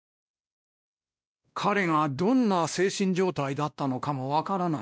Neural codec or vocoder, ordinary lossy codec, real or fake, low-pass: codec, 16 kHz, 1 kbps, X-Codec, WavLM features, trained on Multilingual LibriSpeech; none; fake; none